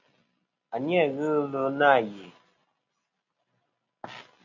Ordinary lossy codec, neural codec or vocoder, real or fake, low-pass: MP3, 48 kbps; none; real; 7.2 kHz